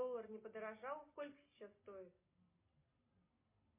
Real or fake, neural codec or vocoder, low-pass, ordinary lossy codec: real; none; 3.6 kHz; MP3, 24 kbps